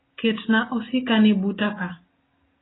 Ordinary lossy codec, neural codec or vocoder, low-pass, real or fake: AAC, 16 kbps; none; 7.2 kHz; real